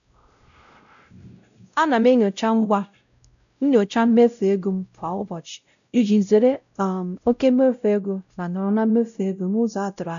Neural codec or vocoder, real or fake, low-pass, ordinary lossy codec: codec, 16 kHz, 0.5 kbps, X-Codec, WavLM features, trained on Multilingual LibriSpeech; fake; 7.2 kHz; none